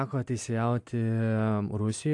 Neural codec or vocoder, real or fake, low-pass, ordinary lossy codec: none; real; 10.8 kHz; AAC, 64 kbps